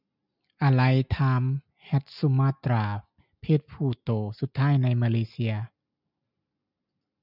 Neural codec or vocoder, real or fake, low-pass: none; real; 5.4 kHz